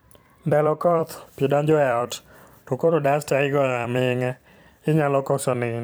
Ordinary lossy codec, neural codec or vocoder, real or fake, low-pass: none; vocoder, 44.1 kHz, 128 mel bands every 256 samples, BigVGAN v2; fake; none